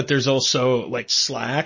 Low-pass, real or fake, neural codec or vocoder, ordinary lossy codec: 7.2 kHz; real; none; MP3, 32 kbps